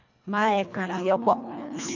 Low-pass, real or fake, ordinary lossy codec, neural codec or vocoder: 7.2 kHz; fake; none; codec, 24 kHz, 1.5 kbps, HILCodec